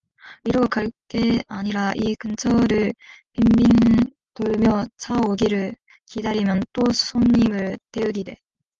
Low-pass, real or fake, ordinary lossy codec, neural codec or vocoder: 7.2 kHz; real; Opus, 32 kbps; none